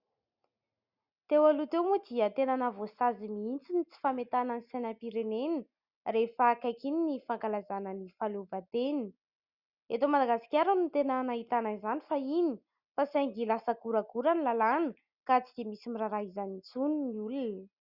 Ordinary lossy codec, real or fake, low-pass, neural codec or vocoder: Opus, 64 kbps; real; 5.4 kHz; none